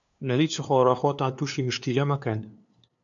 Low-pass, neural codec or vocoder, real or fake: 7.2 kHz; codec, 16 kHz, 2 kbps, FunCodec, trained on LibriTTS, 25 frames a second; fake